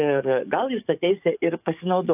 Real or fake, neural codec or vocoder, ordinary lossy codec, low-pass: fake; codec, 44.1 kHz, 7.8 kbps, DAC; AAC, 32 kbps; 3.6 kHz